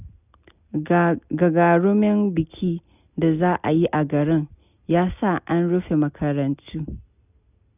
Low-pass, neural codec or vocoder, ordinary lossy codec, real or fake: 3.6 kHz; none; none; real